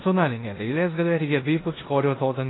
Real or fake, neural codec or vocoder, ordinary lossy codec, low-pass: fake; codec, 16 kHz in and 24 kHz out, 0.9 kbps, LongCat-Audio-Codec, four codebook decoder; AAC, 16 kbps; 7.2 kHz